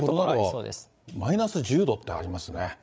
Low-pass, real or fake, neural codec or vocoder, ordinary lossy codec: none; fake; codec, 16 kHz, 8 kbps, FreqCodec, larger model; none